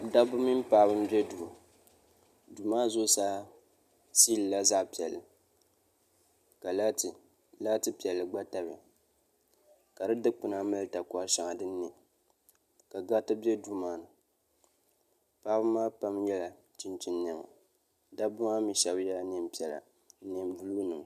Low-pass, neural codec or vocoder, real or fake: 14.4 kHz; none; real